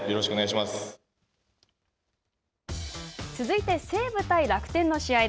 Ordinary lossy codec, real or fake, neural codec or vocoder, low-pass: none; real; none; none